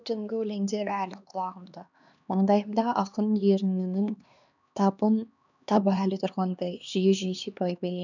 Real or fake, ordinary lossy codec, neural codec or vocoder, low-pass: fake; none; codec, 16 kHz, 2 kbps, X-Codec, HuBERT features, trained on LibriSpeech; 7.2 kHz